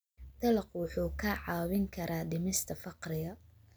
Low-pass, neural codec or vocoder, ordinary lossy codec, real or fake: none; none; none; real